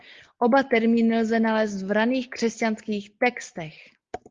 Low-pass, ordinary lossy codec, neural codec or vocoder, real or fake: 7.2 kHz; Opus, 32 kbps; none; real